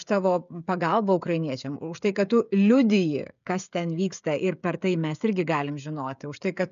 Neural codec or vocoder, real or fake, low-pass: codec, 16 kHz, 16 kbps, FreqCodec, smaller model; fake; 7.2 kHz